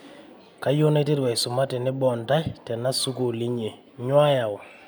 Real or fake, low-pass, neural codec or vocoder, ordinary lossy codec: real; none; none; none